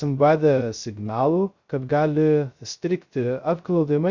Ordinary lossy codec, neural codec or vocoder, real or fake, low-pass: Opus, 64 kbps; codec, 16 kHz, 0.2 kbps, FocalCodec; fake; 7.2 kHz